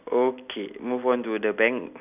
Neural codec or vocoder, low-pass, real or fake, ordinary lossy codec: none; 3.6 kHz; real; none